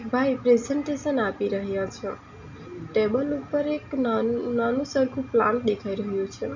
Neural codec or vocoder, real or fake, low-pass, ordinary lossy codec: none; real; 7.2 kHz; none